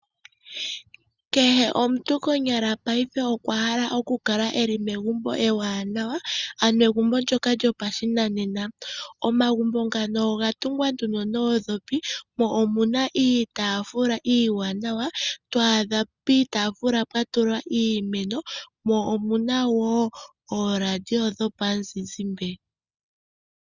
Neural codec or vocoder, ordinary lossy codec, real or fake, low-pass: none; Opus, 64 kbps; real; 7.2 kHz